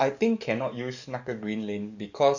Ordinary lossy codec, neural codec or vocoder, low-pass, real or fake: none; codec, 44.1 kHz, 7.8 kbps, DAC; 7.2 kHz; fake